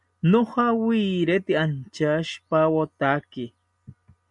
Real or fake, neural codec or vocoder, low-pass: real; none; 10.8 kHz